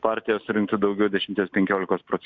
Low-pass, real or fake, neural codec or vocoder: 7.2 kHz; real; none